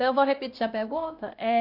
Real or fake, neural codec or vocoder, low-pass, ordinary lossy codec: fake; codec, 16 kHz, 1 kbps, X-Codec, WavLM features, trained on Multilingual LibriSpeech; 5.4 kHz; AAC, 48 kbps